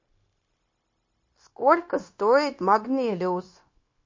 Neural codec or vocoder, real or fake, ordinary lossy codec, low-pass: codec, 16 kHz, 0.9 kbps, LongCat-Audio-Codec; fake; MP3, 32 kbps; 7.2 kHz